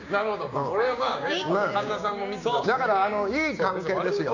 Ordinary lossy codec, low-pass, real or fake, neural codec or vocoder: none; 7.2 kHz; fake; codec, 16 kHz, 6 kbps, DAC